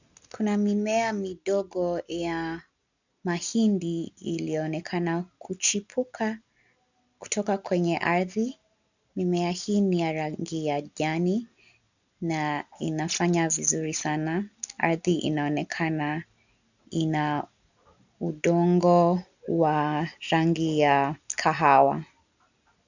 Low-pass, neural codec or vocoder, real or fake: 7.2 kHz; none; real